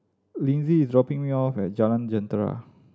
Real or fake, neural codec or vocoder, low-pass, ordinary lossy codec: real; none; none; none